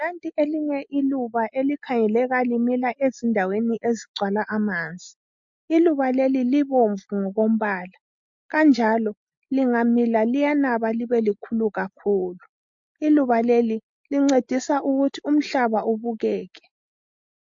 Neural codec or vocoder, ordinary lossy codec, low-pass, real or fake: none; MP3, 48 kbps; 7.2 kHz; real